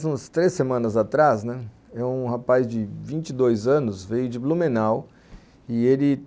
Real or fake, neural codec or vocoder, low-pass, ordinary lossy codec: real; none; none; none